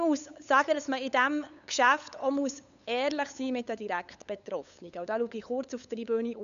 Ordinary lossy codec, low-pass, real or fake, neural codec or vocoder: none; 7.2 kHz; fake; codec, 16 kHz, 8 kbps, FunCodec, trained on LibriTTS, 25 frames a second